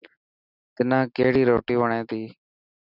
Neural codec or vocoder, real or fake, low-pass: none; real; 5.4 kHz